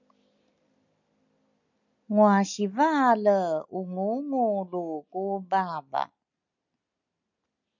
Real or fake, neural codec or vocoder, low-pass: real; none; 7.2 kHz